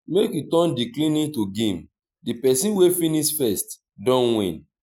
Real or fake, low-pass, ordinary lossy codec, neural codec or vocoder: real; none; none; none